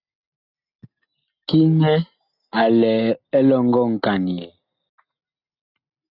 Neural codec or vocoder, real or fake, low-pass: none; real; 5.4 kHz